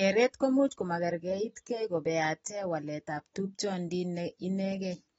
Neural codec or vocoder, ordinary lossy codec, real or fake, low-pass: none; AAC, 24 kbps; real; 19.8 kHz